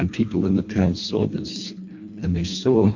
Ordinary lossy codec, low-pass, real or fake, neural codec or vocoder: MP3, 48 kbps; 7.2 kHz; fake; codec, 24 kHz, 1.5 kbps, HILCodec